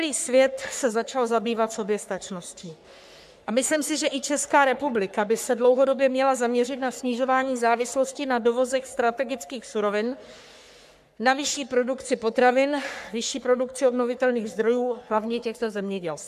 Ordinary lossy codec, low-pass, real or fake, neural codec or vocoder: AAC, 96 kbps; 14.4 kHz; fake; codec, 44.1 kHz, 3.4 kbps, Pupu-Codec